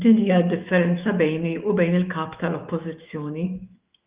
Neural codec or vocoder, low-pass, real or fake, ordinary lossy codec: autoencoder, 48 kHz, 128 numbers a frame, DAC-VAE, trained on Japanese speech; 3.6 kHz; fake; Opus, 24 kbps